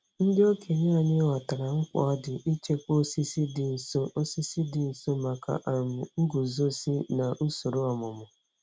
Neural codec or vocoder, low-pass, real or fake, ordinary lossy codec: none; none; real; none